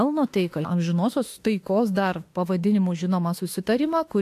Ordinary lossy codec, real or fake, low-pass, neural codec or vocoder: AAC, 64 kbps; fake; 14.4 kHz; autoencoder, 48 kHz, 32 numbers a frame, DAC-VAE, trained on Japanese speech